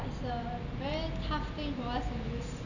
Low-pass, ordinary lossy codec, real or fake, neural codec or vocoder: 7.2 kHz; none; real; none